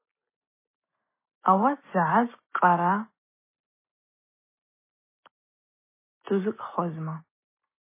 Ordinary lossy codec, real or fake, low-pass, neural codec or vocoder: MP3, 16 kbps; real; 3.6 kHz; none